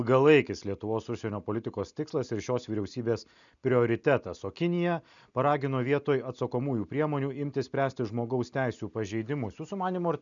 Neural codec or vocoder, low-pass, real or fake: none; 7.2 kHz; real